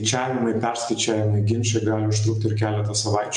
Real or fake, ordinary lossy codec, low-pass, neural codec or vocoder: real; MP3, 64 kbps; 10.8 kHz; none